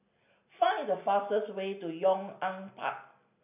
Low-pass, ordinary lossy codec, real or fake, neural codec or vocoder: 3.6 kHz; none; real; none